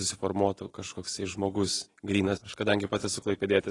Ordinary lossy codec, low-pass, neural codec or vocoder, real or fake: AAC, 32 kbps; 10.8 kHz; none; real